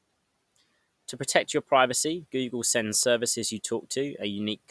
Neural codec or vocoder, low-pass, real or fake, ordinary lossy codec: none; none; real; none